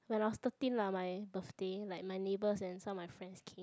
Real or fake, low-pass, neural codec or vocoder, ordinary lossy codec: real; none; none; none